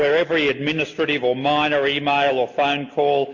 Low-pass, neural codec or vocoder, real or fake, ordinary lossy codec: 7.2 kHz; none; real; MP3, 48 kbps